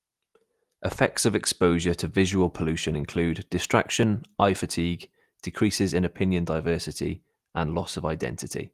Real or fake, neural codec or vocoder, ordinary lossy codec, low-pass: real; none; Opus, 24 kbps; 14.4 kHz